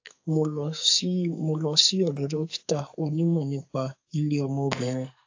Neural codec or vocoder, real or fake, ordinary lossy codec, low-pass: codec, 44.1 kHz, 2.6 kbps, SNAC; fake; AAC, 48 kbps; 7.2 kHz